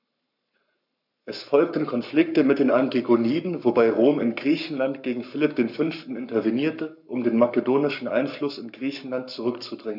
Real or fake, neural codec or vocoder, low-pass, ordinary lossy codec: fake; codec, 44.1 kHz, 7.8 kbps, Pupu-Codec; 5.4 kHz; none